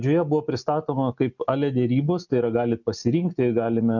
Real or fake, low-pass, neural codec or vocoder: real; 7.2 kHz; none